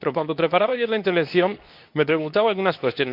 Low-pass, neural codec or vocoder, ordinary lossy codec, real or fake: 5.4 kHz; codec, 24 kHz, 0.9 kbps, WavTokenizer, medium speech release version 2; MP3, 48 kbps; fake